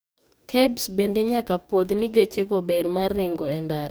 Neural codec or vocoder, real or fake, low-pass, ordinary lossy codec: codec, 44.1 kHz, 2.6 kbps, DAC; fake; none; none